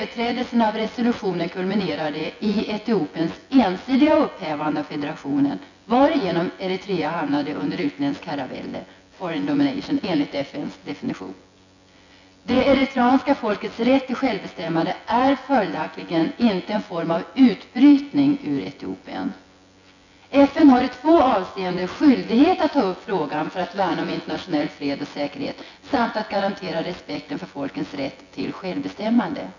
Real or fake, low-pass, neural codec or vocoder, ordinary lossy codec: fake; 7.2 kHz; vocoder, 24 kHz, 100 mel bands, Vocos; none